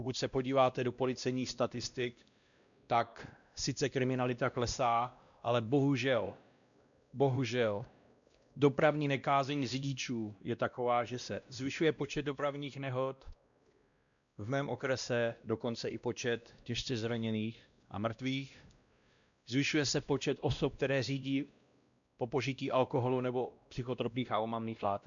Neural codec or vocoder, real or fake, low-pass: codec, 16 kHz, 1 kbps, X-Codec, WavLM features, trained on Multilingual LibriSpeech; fake; 7.2 kHz